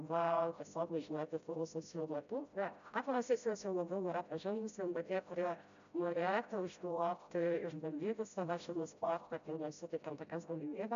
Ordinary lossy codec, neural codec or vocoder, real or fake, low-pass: AAC, 96 kbps; codec, 16 kHz, 0.5 kbps, FreqCodec, smaller model; fake; 7.2 kHz